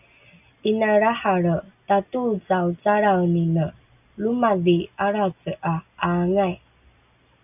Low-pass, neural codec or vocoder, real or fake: 3.6 kHz; none; real